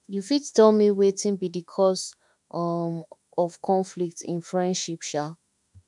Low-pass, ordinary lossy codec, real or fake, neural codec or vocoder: 10.8 kHz; none; fake; autoencoder, 48 kHz, 32 numbers a frame, DAC-VAE, trained on Japanese speech